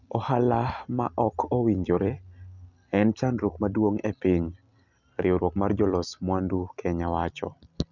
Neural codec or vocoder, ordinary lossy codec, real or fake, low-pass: none; none; real; 7.2 kHz